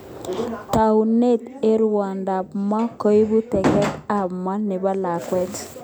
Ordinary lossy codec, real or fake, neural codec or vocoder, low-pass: none; real; none; none